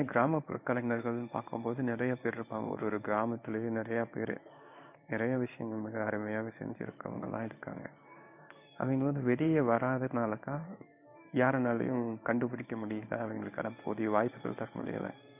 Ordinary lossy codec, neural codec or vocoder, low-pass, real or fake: none; codec, 16 kHz in and 24 kHz out, 1 kbps, XY-Tokenizer; 3.6 kHz; fake